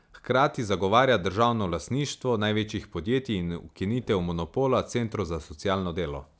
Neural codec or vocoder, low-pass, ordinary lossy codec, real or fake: none; none; none; real